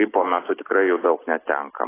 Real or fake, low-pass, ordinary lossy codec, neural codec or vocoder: real; 3.6 kHz; AAC, 16 kbps; none